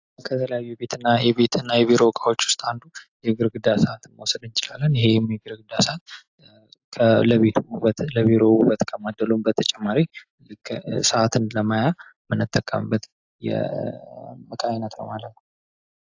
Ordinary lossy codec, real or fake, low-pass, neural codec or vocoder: AAC, 48 kbps; real; 7.2 kHz; none